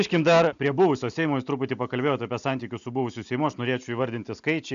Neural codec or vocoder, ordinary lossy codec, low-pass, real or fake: none; AAC, 96 kbps; 7.2 kHz; real